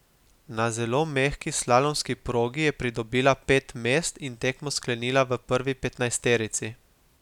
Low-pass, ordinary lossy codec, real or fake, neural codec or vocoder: 19.8 kHz; none; real; none